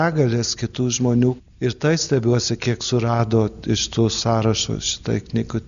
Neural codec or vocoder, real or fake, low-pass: none; real; 7.2 kHz